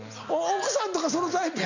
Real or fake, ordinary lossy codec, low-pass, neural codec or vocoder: real; none; 7.2 kHz; none